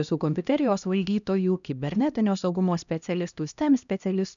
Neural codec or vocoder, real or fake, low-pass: codec, 16 kHz, 1 kbps, X-Codec, HuBERT features, trained on LibriSpeech; fake; 7.2 kHz